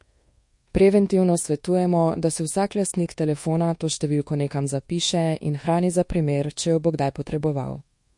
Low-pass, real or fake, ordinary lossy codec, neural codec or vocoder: 10.8 kHz; fake; MP3, 48 kbps; codec, 24 kHz, 1.2 kbps, DualCodec